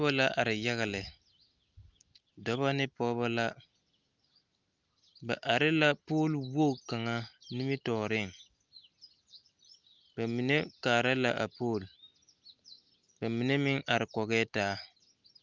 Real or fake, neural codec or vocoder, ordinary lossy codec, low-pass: real; none; Opus, 24 kbps; 7.2 kHz